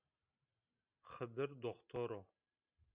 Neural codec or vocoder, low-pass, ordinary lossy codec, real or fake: none; 3.6 kHz; Opus, 64 kbps; real